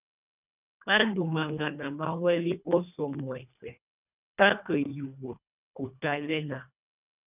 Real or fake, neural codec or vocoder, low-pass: fake; codec, 24 kHz, 1.5 kbps, HILCodec; 3.6 kHz